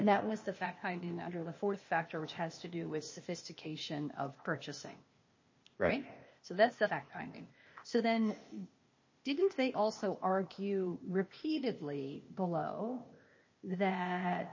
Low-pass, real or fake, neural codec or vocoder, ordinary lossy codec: 7.2 kHz; fake; codec, 16 kHz, 0.8 kbps, ZipCodec; MP3, 32 kbps